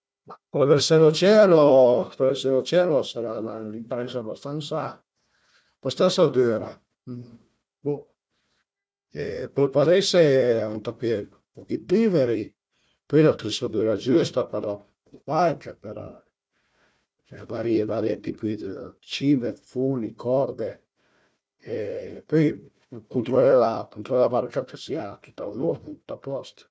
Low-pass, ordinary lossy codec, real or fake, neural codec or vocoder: none; none; fake; codec, 16 kHz, 1 kbps, FunCodec, trained on Chinese and English, 50 frames a second